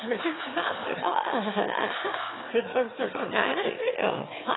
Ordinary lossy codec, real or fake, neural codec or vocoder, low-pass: AAC, 16 kbps; fake; autoencoder, 22.05 kHz, a latent of 192 numbers a frame, VITS, trained on one speaker; 7.2 kHz